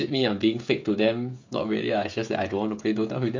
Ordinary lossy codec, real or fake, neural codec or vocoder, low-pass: MP3, 48 kbps; fake; codec, 16 kHz, 16 kbps, FreqCodec, smaller model; 7.2 kHz